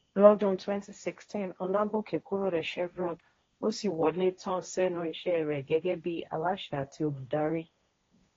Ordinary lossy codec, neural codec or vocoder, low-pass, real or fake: AAC, 32 kbps; codec, 16 kHz, 1.1 kbps, Voila-Tokenizer; 7.2 kHz; fake